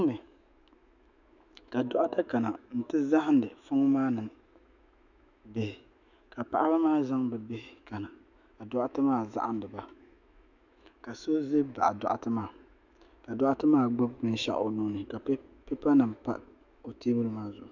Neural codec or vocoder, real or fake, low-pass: autoencoder, 48 kHz, 128 numbers a frame, DAC-VAE, trained on Japanese speech; fake; 7.2 kHz